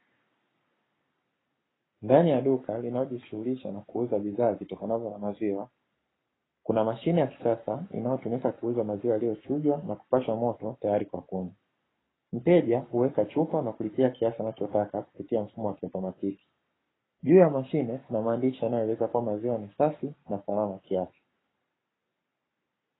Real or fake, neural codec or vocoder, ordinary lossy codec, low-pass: fake; codec, 44.1 kHz, 7.8 kbps, Pupu-Codec; AAC, 16 kbps; 7.2 kHz